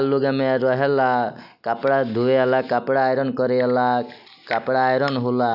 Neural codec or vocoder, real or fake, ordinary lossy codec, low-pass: none; real; none; 5.4 kHz